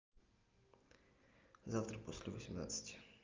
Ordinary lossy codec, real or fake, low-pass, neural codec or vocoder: Opus, 24 kbps; real; 7.2 kHz; none